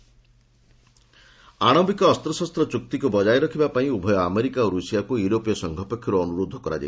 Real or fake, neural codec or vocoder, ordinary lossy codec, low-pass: real; none; none; none